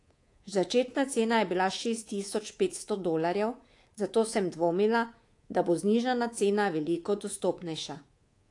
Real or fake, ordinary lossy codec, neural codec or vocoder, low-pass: fake; AAC, 48 kbps; codec, 24 kHz, 3.1 kbps, DualCodec; 10.8 kHz